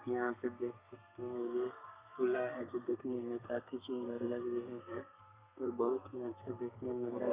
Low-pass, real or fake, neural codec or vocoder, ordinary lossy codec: 3.6 kHz; fake; codec, 32 kHz, 1.9 kbps, SNAC; Opus, 64 kbps